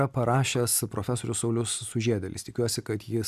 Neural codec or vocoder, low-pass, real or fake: none; 14.4 kHz; real